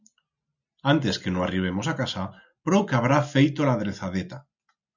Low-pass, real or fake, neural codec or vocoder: 7.2 kHz; real; none